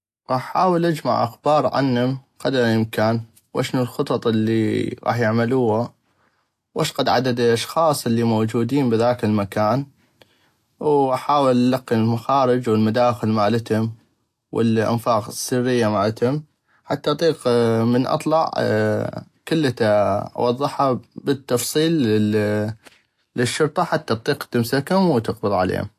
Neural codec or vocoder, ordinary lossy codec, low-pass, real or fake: none; AAC, 64 kbps; 14.4 kHz; real